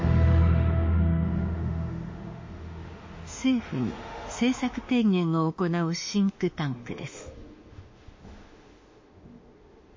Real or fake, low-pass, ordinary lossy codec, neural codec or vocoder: fake; 7.2 kHz; MP3, 32 kbps; autoencoder, 48 kHz, 32 numbers a frame, DAC-VAE, trained on Japanese speech